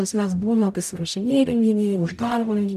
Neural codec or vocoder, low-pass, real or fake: codec, 44.1 kHz, 0.9 kbps, DAC; 14.4 kHz; fake